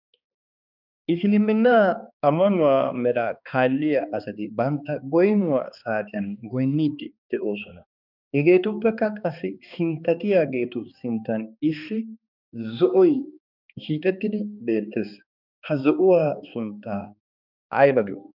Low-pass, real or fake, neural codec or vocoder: 5.4 kHz; fake; codec, 16 kHz, 2 kbps, X-Codec, HuBERT features, trained on balanced general audio